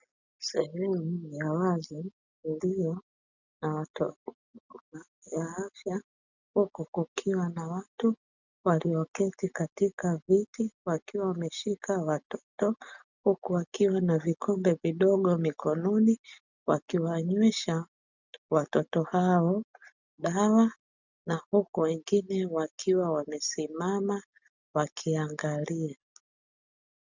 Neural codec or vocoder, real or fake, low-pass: none; real; 7.2 kHz